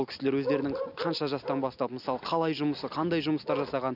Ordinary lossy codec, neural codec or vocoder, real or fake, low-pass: none; none; real; 5.4 kHz